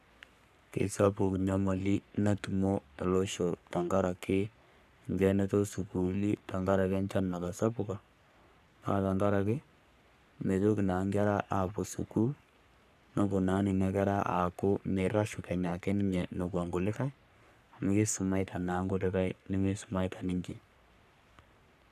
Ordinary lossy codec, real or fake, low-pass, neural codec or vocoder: none; fake; 14.4 kHz; codec, 44.1 kHz, 3.4 kbps, Pupu-Codec